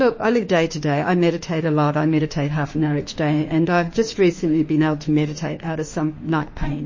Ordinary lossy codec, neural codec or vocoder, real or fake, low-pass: MP3, 32 kbps; autoencoder, 48 kHz, 32 numbers a frame, DAC-VAE, trained on Japanese speech; fake; 7.2 kHz